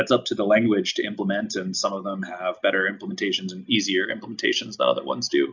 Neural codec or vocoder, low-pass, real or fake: none; 7.2 kHz; real